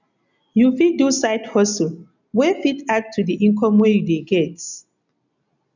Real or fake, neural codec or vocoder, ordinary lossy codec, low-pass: real; none; none; 7.2 kHz